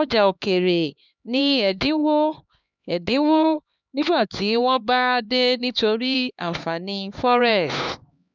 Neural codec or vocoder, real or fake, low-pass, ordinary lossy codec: codec, 16 kHz, 2 kbps, X-Codec, HuBERT features, trained on LibriSpeech; fake; 7.2 kHz; none